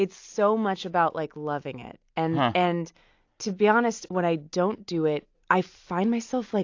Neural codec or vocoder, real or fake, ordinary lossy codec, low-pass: none; real; AAC, 48 kbps; 7.2 kHz